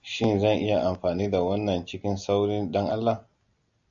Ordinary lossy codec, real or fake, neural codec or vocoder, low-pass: AAC, 64 kbps; real; none; 7.2 kHz